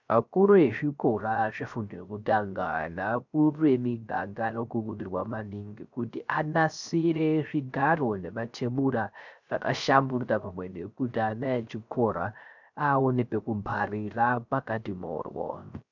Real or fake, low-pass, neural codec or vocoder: fake; 7.2 kHz; codec, 16 kHz, 0.3 kbps, FocalCodec